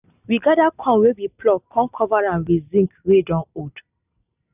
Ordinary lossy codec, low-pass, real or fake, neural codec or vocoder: none; 3.6 kHz; real; none